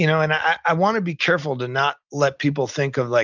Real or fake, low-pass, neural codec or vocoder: real; 7.2 kHz; none